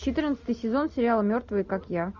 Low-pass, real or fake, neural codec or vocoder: 7.2 kHz; real; none